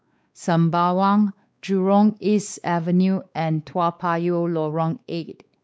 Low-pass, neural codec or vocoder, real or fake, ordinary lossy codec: none; codec, 16 kHz, 4 kbps, X-Codec, WavLM features, trained on Multilingual LibriSpeech; fake; none